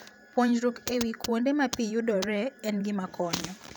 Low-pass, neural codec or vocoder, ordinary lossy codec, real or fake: none; vocoder, 44.1 kHz, 128 mel bands every 512 samples, BigVGAN v2; none; fake